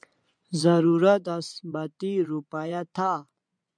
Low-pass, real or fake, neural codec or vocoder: 9.9 kHz; fake; vocoder, 24 kHz, 100 mel bands, Vocos